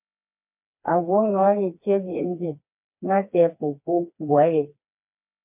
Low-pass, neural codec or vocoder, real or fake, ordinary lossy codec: 3.6 kHz; codec, 16 kHz, 2 kbps, FreqCodec, smaller model; fake; MP3, 32 kbps